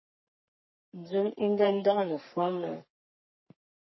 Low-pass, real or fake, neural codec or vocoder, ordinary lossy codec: 7.2 kHz; fake; codec, 44.1 kHz, 3.4 kbps, Pupu-Codec; MP3, 24 kbps